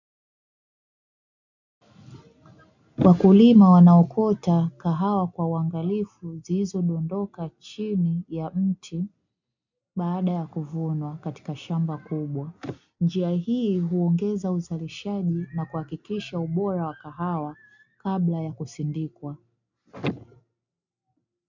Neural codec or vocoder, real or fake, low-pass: none; real; 7.2 kHz